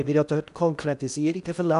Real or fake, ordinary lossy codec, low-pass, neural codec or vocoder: fake; none; 10.8 kHz; codec, 16 kHz in and 24 kHz out, 0.8 kbps, FocalCodec, streaming, 65536 codes